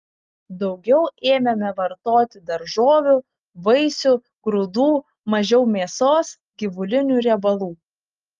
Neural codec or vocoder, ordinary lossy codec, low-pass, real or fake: none; Opus, 32 kbps; 7.2 kHz; real